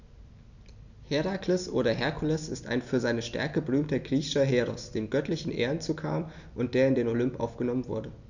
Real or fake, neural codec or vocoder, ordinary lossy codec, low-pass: real; none; none; 7.2 kHz